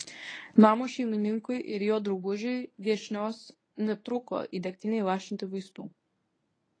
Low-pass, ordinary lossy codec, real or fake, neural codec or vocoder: 9.9 kHz; AAC, 32 kbps; fake; codec, 24 kHz, 0.9 kbps, WavTokenizer, medium speech release version 1